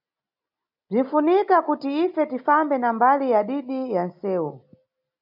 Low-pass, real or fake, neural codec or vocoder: 5.4 kHz; real; none